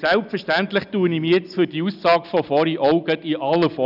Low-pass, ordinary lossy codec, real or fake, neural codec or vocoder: 5.4 kHz; none; real; none